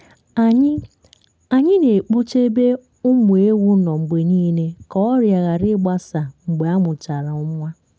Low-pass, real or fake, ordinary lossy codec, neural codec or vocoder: none; real; none; none